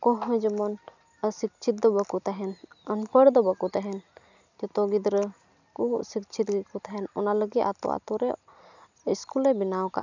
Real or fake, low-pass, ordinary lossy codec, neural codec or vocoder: real; 7.2 kHz; none; none